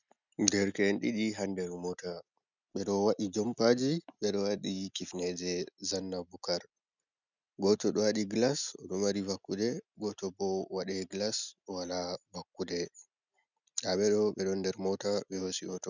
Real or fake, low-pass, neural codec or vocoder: real; 7.2 kHz; none